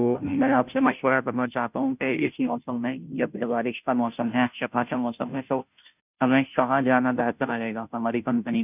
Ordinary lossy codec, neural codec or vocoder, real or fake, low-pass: none; codec, 16 kHz, 0.5 kbps, FunCodec, trained on Chinese and English, 25 frames a second; fake; 3.6 kHz